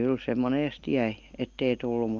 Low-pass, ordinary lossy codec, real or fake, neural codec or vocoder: 7.2 kHz; Opus, 24 kbps; real; none